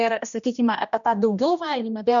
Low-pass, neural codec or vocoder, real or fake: 7.2 kHz; codec, 16 kHz, 1 kbps, X-Codec, HuBERT features, trained on general audio; fake